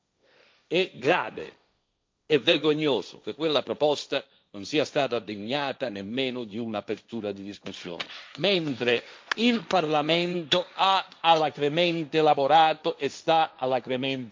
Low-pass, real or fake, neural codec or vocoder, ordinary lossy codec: none; fake; codec, 16 kHz, 1.1 kbps, Voila-Tokenizer; none